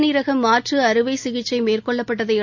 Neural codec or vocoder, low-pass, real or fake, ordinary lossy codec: none; 7.2 kHz; real; none